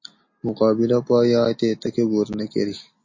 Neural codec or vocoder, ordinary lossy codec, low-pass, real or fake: none; MP3, 32 kbps; 7.2 kHz; real